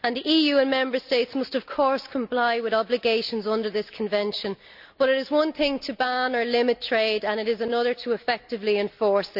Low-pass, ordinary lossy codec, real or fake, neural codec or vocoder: 5.4 kHz; none; real; none